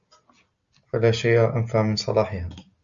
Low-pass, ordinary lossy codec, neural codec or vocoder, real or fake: 7.2 kHz; Opus, 64 kbps; none; real